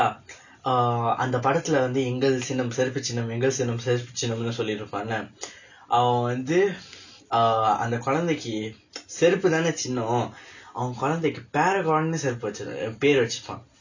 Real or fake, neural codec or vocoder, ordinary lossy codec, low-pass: real; none; none; 7.2 kHz